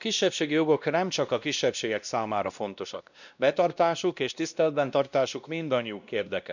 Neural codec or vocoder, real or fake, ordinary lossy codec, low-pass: codec, 16 kHz, 1 kbps, X-Codec, WavLM features, trained on Multilingual LibriSpeech; fake; none; 7.2 kHz